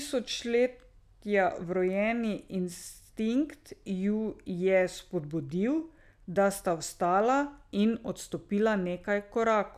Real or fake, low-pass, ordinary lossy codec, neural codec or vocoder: real; 14.4 kHz; none; none